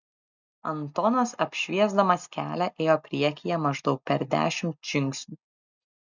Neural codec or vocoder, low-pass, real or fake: none; 7.2 kHz; real